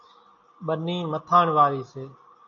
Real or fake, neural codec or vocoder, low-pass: real; none; 7.2 kHz